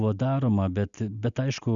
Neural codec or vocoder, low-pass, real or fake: none; 7.2 kHz; real